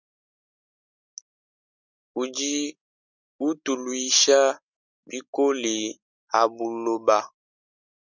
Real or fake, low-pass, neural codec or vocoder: real; 7.2 kHz; none